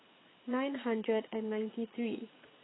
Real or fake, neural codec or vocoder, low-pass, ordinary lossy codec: fake; vocoder, 22.05 kHz, 80 mel bands, Vocos; 7.2 kHz; AAC, 16 kbps